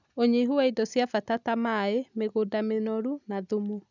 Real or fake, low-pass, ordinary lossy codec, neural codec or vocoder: real; 7.2 kHz; none; none